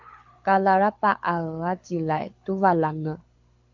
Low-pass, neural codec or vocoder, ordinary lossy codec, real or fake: 7.2 kHz; codec, 24 kHz, 6 kbps, HILCodec; MP3, 64 kbps; fake